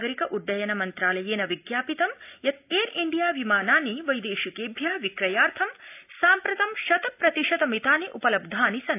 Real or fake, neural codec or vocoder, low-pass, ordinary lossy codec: real; none; 3.6 kHz; AAC, 32 kbps